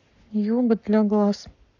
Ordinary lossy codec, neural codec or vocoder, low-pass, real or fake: none; codec, 44.1 kHz, 7.8 kbps, Pupu-Codec; 7.2 kHz; fake